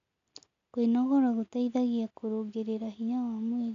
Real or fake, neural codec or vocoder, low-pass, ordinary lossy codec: real; none; 7.2 kHz; AAC, 64 kbps